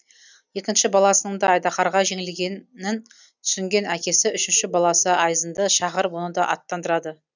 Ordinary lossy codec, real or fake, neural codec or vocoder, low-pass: none; real; none; 7.2 kHz